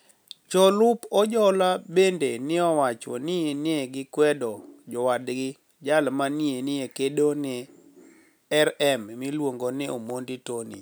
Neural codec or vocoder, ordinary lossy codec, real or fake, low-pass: none; none; real; none